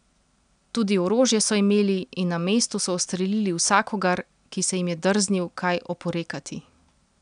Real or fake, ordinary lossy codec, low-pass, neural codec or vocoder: real; none; 9.9 kHz; none